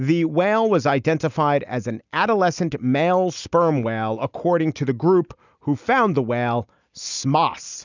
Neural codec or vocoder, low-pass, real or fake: none; 7.2 kHz; real